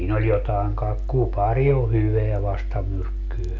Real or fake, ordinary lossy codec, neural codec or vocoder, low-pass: real; none; none; 7.2 kHz